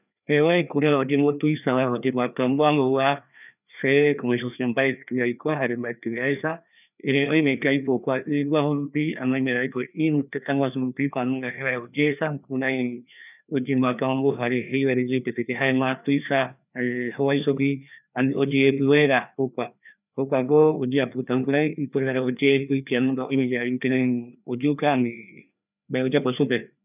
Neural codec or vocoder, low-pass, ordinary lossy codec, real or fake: codec, 16 kHz, 2 kbps, FreqCodec, larger model; 3.6 kHz; none; fake